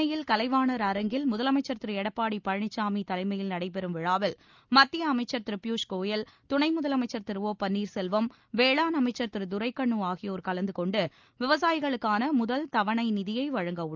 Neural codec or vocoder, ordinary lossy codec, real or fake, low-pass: none; Opus, 24 kbps; real; 7.2 kHz